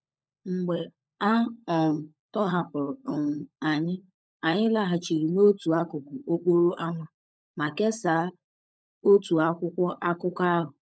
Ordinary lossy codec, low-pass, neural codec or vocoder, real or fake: none; none; codec, 16 kHz, 16 kbps, FunCodec, trained on LibriTTS, 50 frames a second; fake